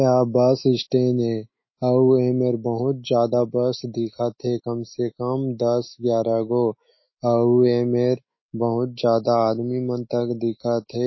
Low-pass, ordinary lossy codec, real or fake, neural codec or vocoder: 7.2 kHz; MP3, 24 kbps; fake; autoencoder, 48 kHz, 128 numbers a frame, DAC-VAE, trained on Japanese speech